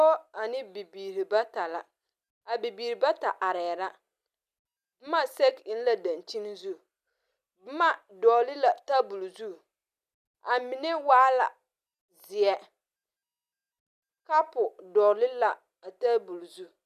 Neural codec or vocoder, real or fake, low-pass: none; real; 14.4 kHz